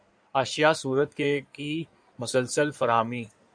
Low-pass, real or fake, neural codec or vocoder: 9.9 kHz; fake; codec, 16 kHz in and 24 kHz out, 2.2 kbps, FireRedTTS-2 codec